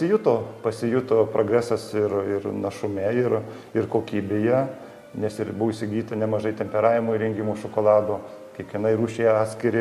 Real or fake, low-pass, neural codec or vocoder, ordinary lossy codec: real; 14.4 kHz; none; MP3, 64 kbps